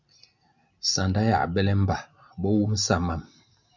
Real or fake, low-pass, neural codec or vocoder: real; 7.2 kHz; none